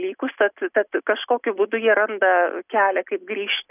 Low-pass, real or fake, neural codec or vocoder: 3.6 kHz; real; none